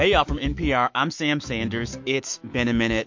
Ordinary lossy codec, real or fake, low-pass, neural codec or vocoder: MP3, 48 kbps; fake; 7.2 kHz; autoencoder, 48 kHz, 128 numbers a frame, DAC-VAE, trained on Japanese speech